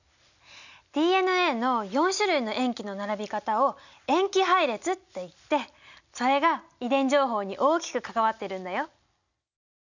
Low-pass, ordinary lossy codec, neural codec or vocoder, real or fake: 7.2 kHz; none; none; real